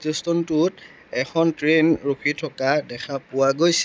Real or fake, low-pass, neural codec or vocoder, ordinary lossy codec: real; none; none; none